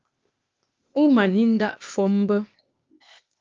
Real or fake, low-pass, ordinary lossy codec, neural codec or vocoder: fake; 7.2 kHz; Opus, 24 kbps; codec, 16 kHz, 0.8 kbps, ZipCodec